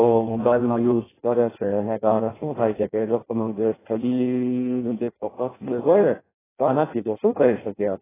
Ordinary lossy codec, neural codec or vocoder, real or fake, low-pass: AAC, 16 kbps; codec, 16 kHz in and 24 kHz out, 0.6 kbps, FireRedTTS-2 codec; fake; 3.6 kHz